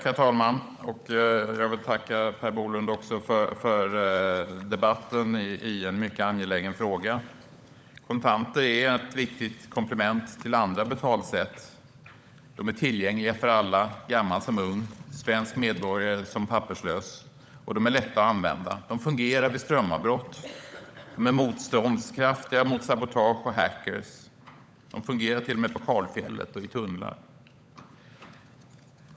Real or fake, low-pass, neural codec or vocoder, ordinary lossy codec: fake; none; codec, 16 kHz, 16 kbps, FunCodec, trained on LibriTTS, 50 frames a second; none